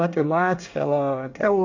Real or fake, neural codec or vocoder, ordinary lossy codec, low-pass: fake; codec, 24 kHz, 1 kbps, SNAC; AAC, 48 kbps; 7.2 kHz